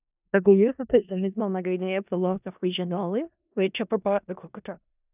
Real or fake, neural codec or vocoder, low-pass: fake; codec, 16 kHz in and 24 kHz out, 0.4 kbps, LongCat-Audio-Codec, four codebook decoder; 3.6 kHz